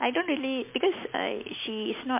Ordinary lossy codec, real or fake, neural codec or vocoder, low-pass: MP3, 32 kbps; real; none; 3.6 kHz